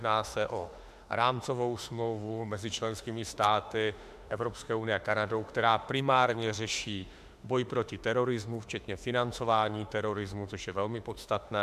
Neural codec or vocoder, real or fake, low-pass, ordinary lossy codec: autoencoder, 48 kHz, 32 numbers a frame, DAC-VAE, trained on Japanese speech; fake; 14.4 kHz; AAC, 96 kbps